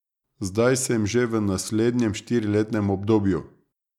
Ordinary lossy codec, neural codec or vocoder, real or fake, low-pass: none; none; real; 19.8 kHz